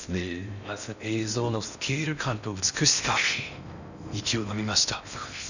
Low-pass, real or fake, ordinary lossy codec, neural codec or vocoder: 7.2 kHz; fake; none; codec, 16 kHz in and 24 kHz out, 0.6 kbps, FocalCodec, streaming, 4096 codes